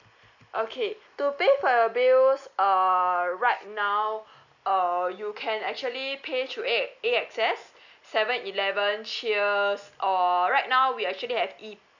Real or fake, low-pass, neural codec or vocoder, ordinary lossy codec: real; 7.2 kHz; none; none